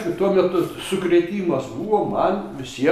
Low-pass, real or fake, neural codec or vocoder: 14.4 kHz; real; none